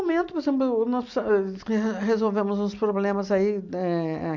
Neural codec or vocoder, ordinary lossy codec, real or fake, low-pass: none; none; real; 7.2 kHz